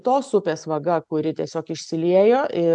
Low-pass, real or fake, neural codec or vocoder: 10.8 kHz; real; none